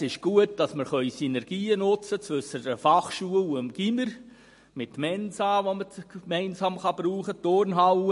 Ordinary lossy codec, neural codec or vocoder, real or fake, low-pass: MP3, 48 kbps; none; real; 14.4 kHz